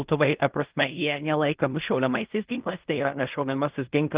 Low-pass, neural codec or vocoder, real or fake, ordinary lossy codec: 3.6 kHz; codec, 16 kHz in and 24 kHz out, 0.4 kbps, LongCat-Audio-Codec, fine tuned four codebook decoder; fake; Opus, 32 kbps